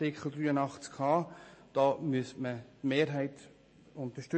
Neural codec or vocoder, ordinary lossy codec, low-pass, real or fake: none; MP3, 32 kbps; 9.9 kHz; real